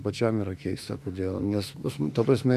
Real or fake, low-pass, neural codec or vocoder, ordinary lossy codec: fake; 14.4 kHz; autoencoder, 48 kHz, 32 numbers a frame, DAC-VAE, trained on Japanese speech; AAC, 96 kbps